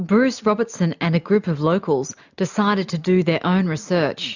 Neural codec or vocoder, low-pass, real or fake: none; 7.2 kHz; real